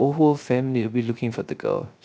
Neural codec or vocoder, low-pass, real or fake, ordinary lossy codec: codec, 16 kHz, 0.3 kbps, FocalCodec; none; fake; none